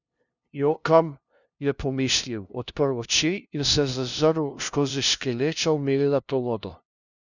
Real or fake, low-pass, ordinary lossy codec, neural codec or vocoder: fake; 7.2 kHz; none; codec, 16 kHz, 0.5 kbps, FunCodec, trained on LibriTTS, 25 frames a second